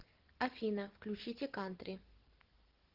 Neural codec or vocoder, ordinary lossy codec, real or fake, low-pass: none; Opus, 16 kbps; real; 5.4 kHz